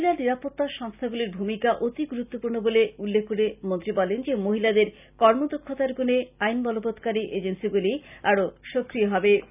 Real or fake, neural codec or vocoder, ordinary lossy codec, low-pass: real; none; none; 3.6 kHz